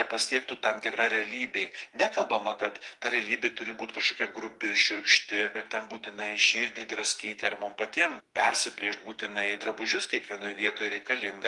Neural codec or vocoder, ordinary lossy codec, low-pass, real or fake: codec, 44.1 kHz, 2.6 kbps, SNAC; Opus, 24 kbps; 10.8 kHz; fake